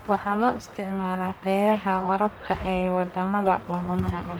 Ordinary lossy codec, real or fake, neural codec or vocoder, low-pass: none; fake; codec, 44.1 kHz, 1.7 kbps, Pupu-Codec; none